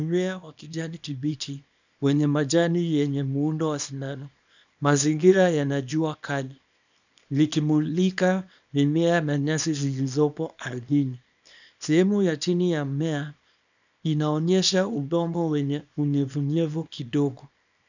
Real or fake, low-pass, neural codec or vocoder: fake; 7.2 kHz; codec, 24 kHz, 0.9 kbps, WavTokenizer, small release